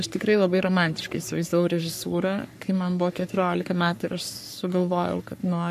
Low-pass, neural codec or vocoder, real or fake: 14.4 kHz; codec, 44.1 kHz, 3.4 kbps, Pupu-Codec; fake